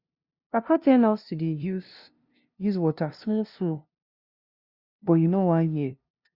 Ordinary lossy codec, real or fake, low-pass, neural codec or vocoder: Opus, 64 kbps; fake; 5.4 kHz; codec, 16 kHz, 0.5 kbps, FunCodec, trained on LibriTTS, 25 frames a second